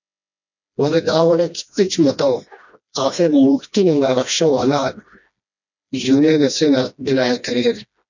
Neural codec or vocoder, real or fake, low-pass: codec, 16 kHz, 1 kbps, FreqCodec, smaller model; fake; 7.2 kHz